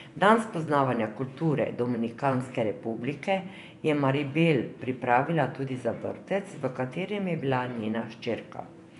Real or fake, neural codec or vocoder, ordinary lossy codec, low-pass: fake; vocoder, 24 kHz, 100 mel bands, Vocos; none; 10.8 kHz